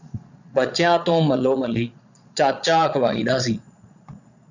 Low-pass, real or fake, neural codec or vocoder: 7.2 kHz; fake; vocoder, 22.05 kHz, 80 mel bands, Vocos